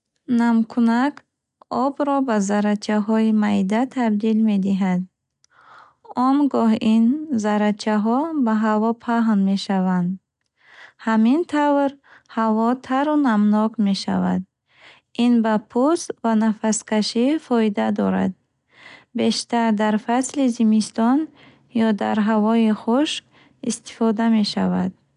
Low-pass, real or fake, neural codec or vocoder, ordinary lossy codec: 10.8 kHz; real; none; none